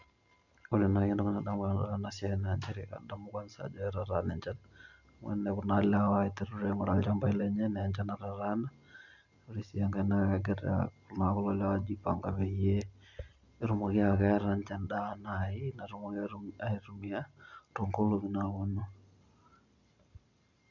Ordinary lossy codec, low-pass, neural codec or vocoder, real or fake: none; 7.2 kHz; none; real